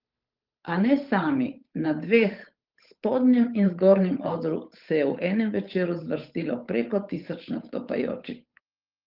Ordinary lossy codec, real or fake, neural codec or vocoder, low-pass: Opus, 24 kbps; fake; codec, 16 kHz, 8 kbps, FunCodec, trained on Chinese and English, 25 frames a second; 5.4 kHz